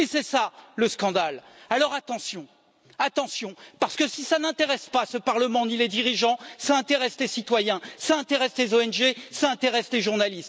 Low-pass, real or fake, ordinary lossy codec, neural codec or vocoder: none; real; none; none